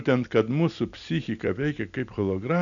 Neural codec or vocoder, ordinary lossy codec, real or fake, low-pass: none; MP3, 96 kbps; real; 7.2 kHz